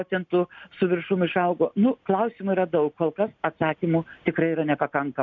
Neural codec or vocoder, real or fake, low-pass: none; real; 7.2 kHz